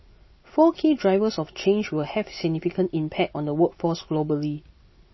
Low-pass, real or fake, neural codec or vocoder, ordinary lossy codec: 7.2 kHz; real; none; MP3, 24 kbps